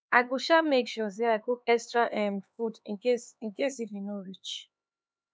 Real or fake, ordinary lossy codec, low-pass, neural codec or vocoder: fake; none; none; codec, 16 kHz, 4 kbps, X-Codec, HuBERT features, trained on LibriSpeech